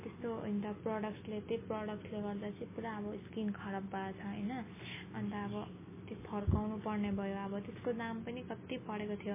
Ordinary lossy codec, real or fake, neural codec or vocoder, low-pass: MP3, 16 kbps; real; none; 3.6 kHz